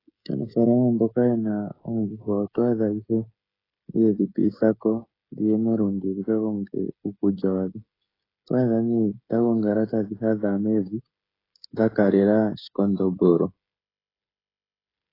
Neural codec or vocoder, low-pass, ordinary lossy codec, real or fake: codec, 16 kHz, 16 kbps, FreqCodec, smaller model; 5.4 kHz; AAC, 24 kbps; fake